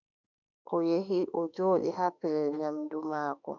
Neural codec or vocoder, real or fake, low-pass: autoencoder, 48 kHz, 32 numbers a frame, DAC-VAE, trained on Japanese speech; fake; 7.2 kHz